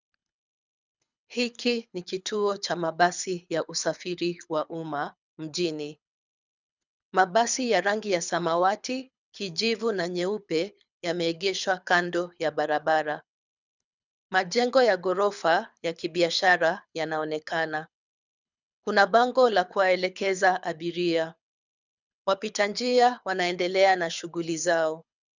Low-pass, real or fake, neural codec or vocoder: 7.2 kHz; fake; codec, 24 kHz, 6 kbps, HILCodec